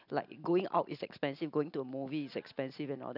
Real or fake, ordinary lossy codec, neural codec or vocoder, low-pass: real; none; none; 5.4 kHz